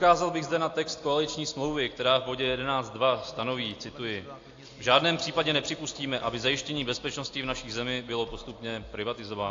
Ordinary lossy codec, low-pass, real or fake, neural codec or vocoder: AAC, 48 kbps; 7.2 kHz; real; none